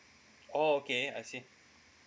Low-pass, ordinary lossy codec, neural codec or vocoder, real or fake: none; none; none; real